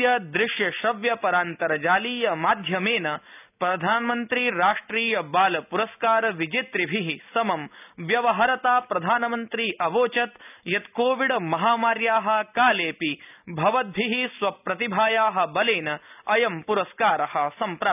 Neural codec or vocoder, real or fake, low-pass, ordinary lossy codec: none; real; 3.6 kHz; none